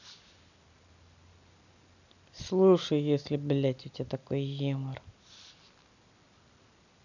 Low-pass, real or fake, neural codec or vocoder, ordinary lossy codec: 7.2 kHz; real; none; none